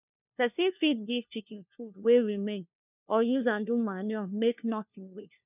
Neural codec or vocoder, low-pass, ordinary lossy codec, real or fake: codec, 16 kHz, 1 kbps, FunCodec, trained on LibriTTS, 50 frames a second; 3.6 kHz; none; fake